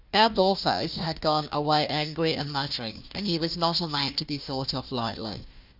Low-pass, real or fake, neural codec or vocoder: 5.4 kHz; fake; codec, 16 kHz, 1 kbps, FunCodec, trained on Chinese and English, 50 frames a second